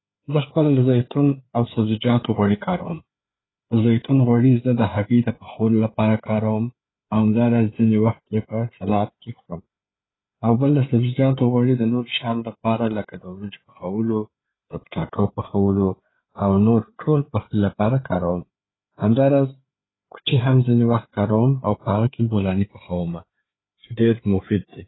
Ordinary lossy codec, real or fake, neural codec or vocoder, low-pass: AAC, 16 kbps; fake; codec, 16 kHz, 4 kbps, FreqCodec, larger model; 7.2 kHz